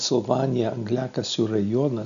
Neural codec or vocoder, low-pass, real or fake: none; 7.2 kHz; real